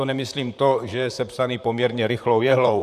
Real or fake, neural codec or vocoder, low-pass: fake; vocoder, 44.1 kHz, 128 mel bands, Pupu-Vocoder; 14.4 kHz